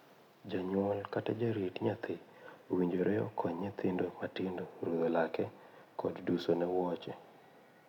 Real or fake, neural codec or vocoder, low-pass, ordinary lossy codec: real; none; 19.8 kHz; none